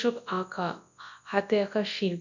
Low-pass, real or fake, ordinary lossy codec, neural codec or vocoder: 7.2 kHz; fake; none; codec, 24 kHz, 0.9 kbps, WavTokenizer, large speech release